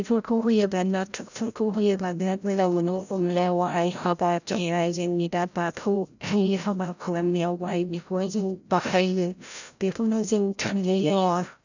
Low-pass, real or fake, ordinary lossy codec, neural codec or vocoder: 7.2 kHz; fake; none; codec, 16 kHz, 0.5 kbps, FreqCodec, larger model